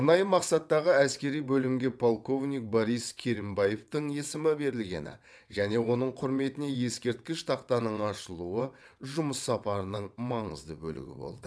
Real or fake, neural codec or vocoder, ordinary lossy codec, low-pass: fake; vocoder, 22.05 kHz, 80 mel bands, WaveNeXt; none; none